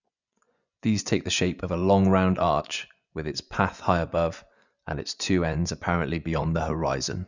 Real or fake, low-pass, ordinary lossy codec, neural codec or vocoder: fake; 7.2 kHz; none; vocoder, 24 kHz, 100 mel bands, Vocos